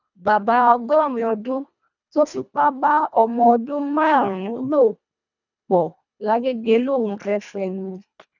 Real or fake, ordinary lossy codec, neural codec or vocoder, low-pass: fake; none; codec, 24 kHz, 1.5 kbps, HILCodec; 7.2 kHz